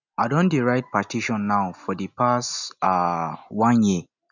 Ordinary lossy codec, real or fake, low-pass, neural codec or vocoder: none; real; 7.2 kHz; none